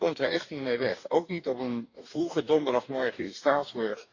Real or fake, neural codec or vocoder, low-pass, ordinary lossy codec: fake; codec, 44.1 kHz, 2.6 kbps, DAC; 7.2 kHz; none